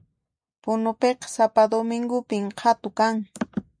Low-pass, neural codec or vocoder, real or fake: 9.9 kHz; none; real